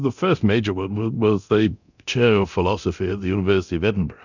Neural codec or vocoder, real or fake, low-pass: codec, 24 kHz, 0.9 kbps, DualCodec; fake; 7.2 kHz